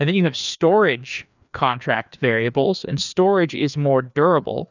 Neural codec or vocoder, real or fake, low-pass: codec, 16 kHz, 2 kbps, FreqCodec, larger model; fake; 7.2 kHz